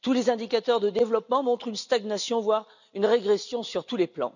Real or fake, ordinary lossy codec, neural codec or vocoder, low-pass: real; none; none; 7.2 kHz